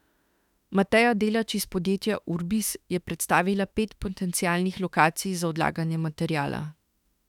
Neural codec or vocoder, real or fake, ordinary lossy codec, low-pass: autoencoder, 48 kHz, 32 numbers a frame, DAC-VAE, trained on Japanese speech; fake; none; 19.8 kHz